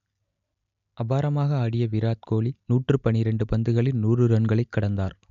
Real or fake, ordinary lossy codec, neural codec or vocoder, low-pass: real; AAC, 96 kbps; none; 7.2 kHz